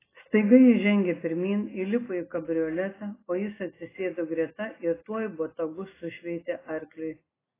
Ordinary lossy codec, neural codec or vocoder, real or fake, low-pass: AAC, 16 kbps; none; real; 3.6 kHz